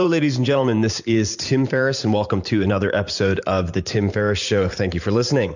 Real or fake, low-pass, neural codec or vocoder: fake; 7.2 kHz; vocoder, 44.1 kHz, 128 mel bands every 512 samples, BigVGAN v2